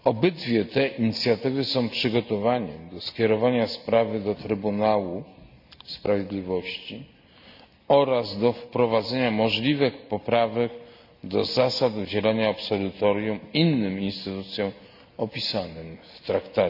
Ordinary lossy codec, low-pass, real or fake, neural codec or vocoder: none; 5.4 kHz; real; none